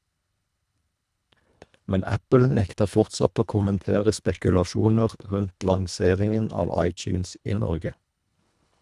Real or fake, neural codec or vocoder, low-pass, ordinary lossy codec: fake; codec, 24 kHz, 1.5 kbps, HILCodec; none; none